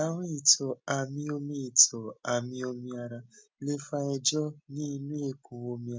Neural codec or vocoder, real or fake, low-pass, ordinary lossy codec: none; real; none; none